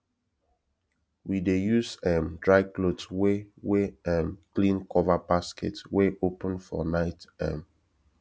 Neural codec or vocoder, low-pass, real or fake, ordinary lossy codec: none; none; real; none